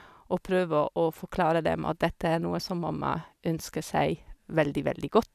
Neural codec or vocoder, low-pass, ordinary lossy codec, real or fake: none; 14.4 kHz; none; real